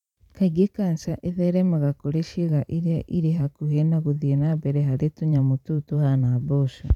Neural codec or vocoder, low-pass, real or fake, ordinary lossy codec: vocoder, 44.1 kHz, 128 mel bands every 512 samples, BigVGAN v2; 19.8 kHz; fake; none